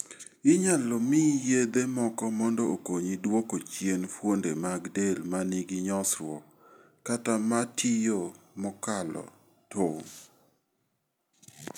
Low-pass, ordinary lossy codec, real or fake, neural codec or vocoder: none; none; real; none